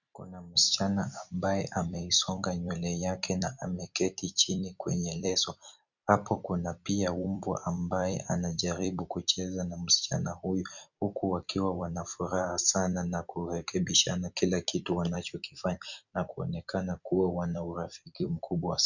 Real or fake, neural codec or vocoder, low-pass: fake; vocoder, 44.1 kHz, 128 mel bands every 256 samples, BigVGAN v2; 7.2 kHz